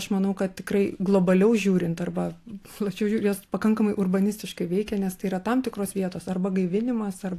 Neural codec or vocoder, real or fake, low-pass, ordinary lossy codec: none; real; 14.4 kHz; AAC, 64 kbps